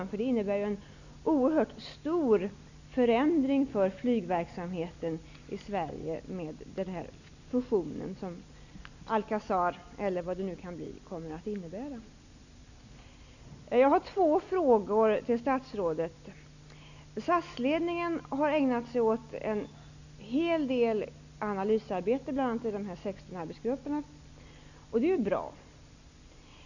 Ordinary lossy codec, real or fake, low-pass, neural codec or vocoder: none; real; 7.2 kHz; none